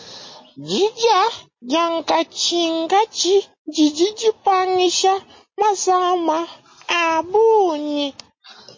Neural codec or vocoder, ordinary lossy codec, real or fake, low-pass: none; MP3, 32 kbps; real; 7.2 kHz